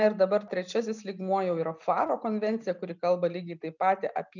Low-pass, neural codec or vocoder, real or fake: 7.2 kHz; none; real